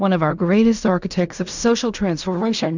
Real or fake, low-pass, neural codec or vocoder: fake; 7.2 kHz; codec, 16 kHz in and 24 kHz out, 0.4 kbps, LongCat-Audio-Codec, fine tuned four codebook decoder